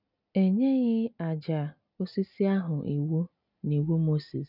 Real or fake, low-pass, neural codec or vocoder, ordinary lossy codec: real; 5.4 kHz; none; none